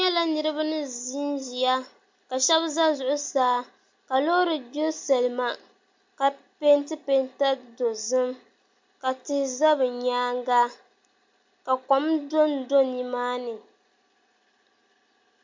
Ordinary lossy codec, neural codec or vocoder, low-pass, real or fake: MP3, 48 kbps; none; 7.2 kHz; real